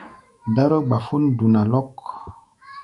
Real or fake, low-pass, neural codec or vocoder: fake; 10.8 kHz; autoencoder, 48 kHz, 128 numbers a frame, DAC-VAE, trained on Japanese speech